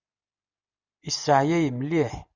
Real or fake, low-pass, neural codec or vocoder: real; 7.2 kHz; none